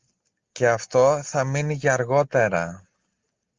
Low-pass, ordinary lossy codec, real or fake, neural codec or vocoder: 7.2 kHz; Opus, 24 kbps; real; none